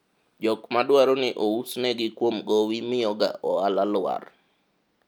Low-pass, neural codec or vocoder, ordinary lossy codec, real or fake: 19.8 kHz; none; none; real